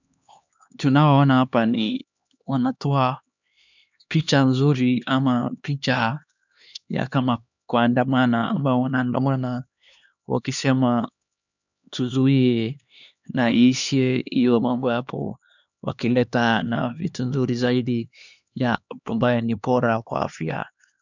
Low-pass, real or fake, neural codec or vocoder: 7.2 kHz; fake; codec, 16 kHz, 2 kbps, X-Codec, HuBERT features, trained on LibriSpeech